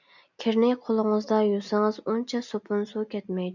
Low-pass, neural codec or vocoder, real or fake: 7.2 kHz; none; real